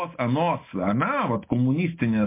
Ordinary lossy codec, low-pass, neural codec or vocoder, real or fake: MP3, 24 kbps; 3.6 kHz; none; real